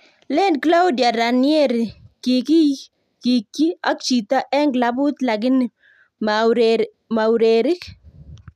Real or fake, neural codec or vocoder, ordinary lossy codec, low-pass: real; none; none; 14.4 kHz